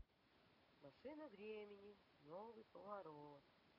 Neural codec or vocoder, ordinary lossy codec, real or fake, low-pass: none; MP3, 32 kbps; real; 5.4 kHz